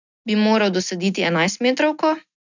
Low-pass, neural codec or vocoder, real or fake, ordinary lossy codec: 7.2 kHz; none; real; none